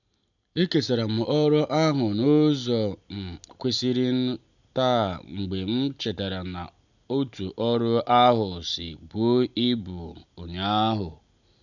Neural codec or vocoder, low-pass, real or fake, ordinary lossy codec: none; 7.2 kHz; real; none